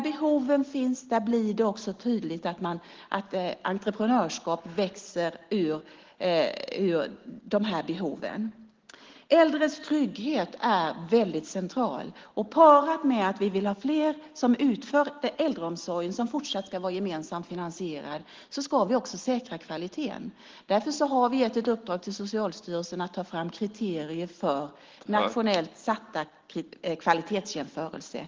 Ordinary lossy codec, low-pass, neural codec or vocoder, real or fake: Opus, 16 kbps; 7.2 kHz; none; real